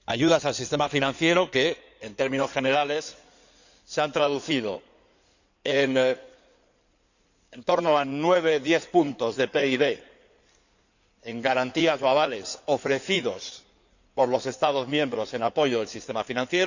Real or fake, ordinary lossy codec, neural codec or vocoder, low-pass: fake; none; codec, 16 kHz in and 24 kHz out, 2.2 kbps, FireRedTTS-2 codec; 7.2 kHz